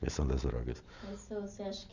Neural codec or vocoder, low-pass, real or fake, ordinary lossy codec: none; 7.2 kHz; real; none